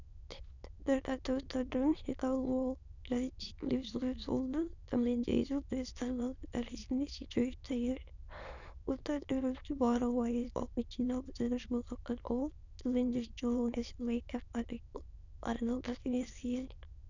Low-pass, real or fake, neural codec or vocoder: 7.2 kHz; fake; autoencoder, 22.05 kHz, a latent of 192 numbers a frame, VITS, trained on many speakers